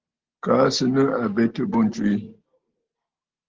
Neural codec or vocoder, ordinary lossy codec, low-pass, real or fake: none; Opus, 16 kbps; 7.2 kHz; real